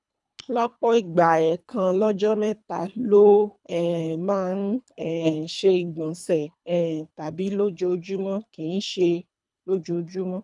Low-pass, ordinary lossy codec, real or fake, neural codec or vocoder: 10.8 kHz; none; fake; codec, 24 kHz, 3 kbps, HILCodec